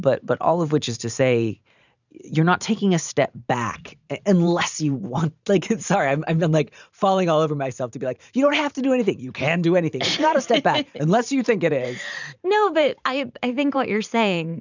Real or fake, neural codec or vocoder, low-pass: fake; vocoder, 44.1 kHz, 128 mel bands every 512 samples, BigVGAN v2; 7.2 kHz